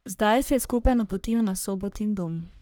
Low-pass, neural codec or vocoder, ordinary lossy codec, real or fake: none; codec, 44.1 kHz, 1.7 kbps, Pupu-Codec; none; fake